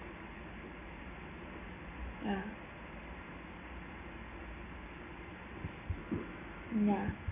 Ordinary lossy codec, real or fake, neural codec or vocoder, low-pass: none; real; none; 3.6 kHz